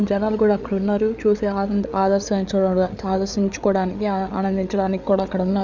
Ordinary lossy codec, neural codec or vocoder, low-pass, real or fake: none; codec, 16 kHz, 8 kbps, FreqCodec, larger model; 7.2 kHz; fake